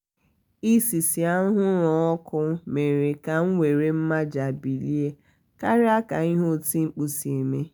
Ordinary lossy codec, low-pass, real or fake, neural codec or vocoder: none; none; real; none